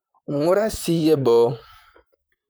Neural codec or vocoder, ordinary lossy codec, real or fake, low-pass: vocoder, 44.1 kHz, 128 mel bands, Pupu-Vocoder; none; fake; none